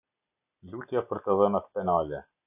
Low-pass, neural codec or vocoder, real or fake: 3.6 kHz; none; real